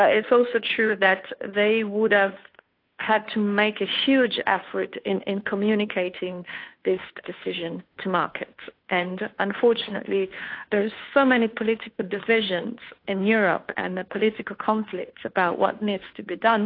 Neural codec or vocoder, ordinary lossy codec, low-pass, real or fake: codec, 16 kHz, 2 kbps, FunCodec, trained on Chinese and English, 25 frames a second; AAC, 32 kbps; 5.4 kHz; fake